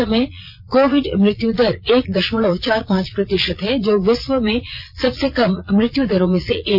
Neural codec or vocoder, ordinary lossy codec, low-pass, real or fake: vocoder, 22.05 kHz, 80 mel bands, Vocos; none; 5.4 kHz; fake